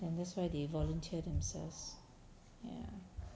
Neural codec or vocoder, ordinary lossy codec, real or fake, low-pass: none; none; real; none